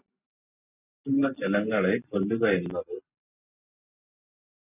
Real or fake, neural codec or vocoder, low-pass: real; none; 3.6 kHz